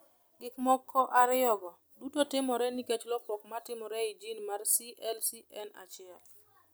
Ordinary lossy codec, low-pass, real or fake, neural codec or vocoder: none; none; real; none